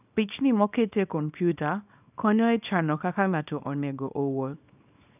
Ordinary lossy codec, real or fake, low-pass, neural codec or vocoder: none; fake; 3.6 kHz; codec, 24 kHz, 0.9 kbps, WavTokenizer, small release